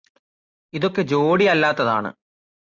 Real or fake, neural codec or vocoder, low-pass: real; none; 7.2 kHz